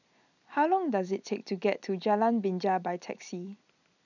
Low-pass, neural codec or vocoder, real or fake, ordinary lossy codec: 7.2 kHz; none; real; none